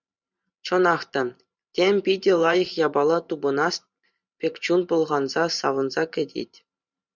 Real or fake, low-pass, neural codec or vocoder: fake; 7.2 kHz; vocoder, 44.1 kHz, 128 mel bands every 512 samples, BigVGAN v2